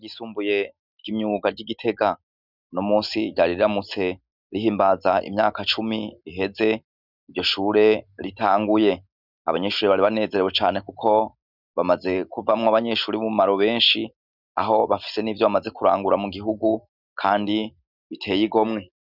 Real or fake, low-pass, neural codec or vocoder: real; 5.4 kHz; none